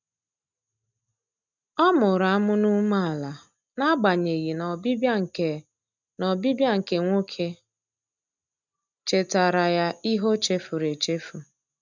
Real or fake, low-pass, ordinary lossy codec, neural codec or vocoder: real; 7.2 kHz; none; none